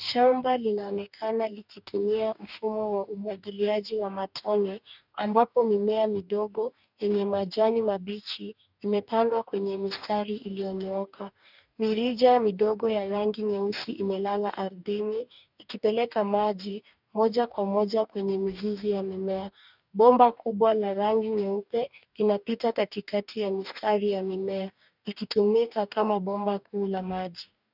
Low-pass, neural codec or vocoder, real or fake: 5.4 kHz; codec, 44.1 kHz, 2.6 kbps, DAC; fake